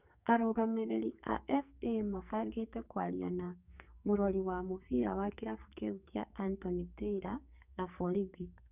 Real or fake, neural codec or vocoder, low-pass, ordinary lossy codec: fake; codec, 44.1 kHz, 2.6 kbps, SNAC; 3.6 kHz; none